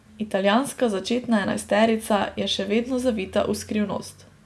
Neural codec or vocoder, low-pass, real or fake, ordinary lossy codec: none; none; real; none